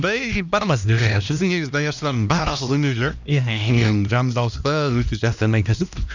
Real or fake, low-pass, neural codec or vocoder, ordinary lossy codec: fake; 7.2 kHz; codec, 16 kHz, 1 kbps, X-Codec, HuBERT features, trained on LibriSpeech; none